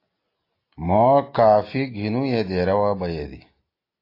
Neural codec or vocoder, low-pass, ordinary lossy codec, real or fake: none; 5.4 kHz; AAC, 32 kbps; real